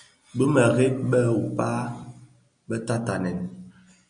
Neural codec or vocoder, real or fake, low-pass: none; real; 9.9 kHz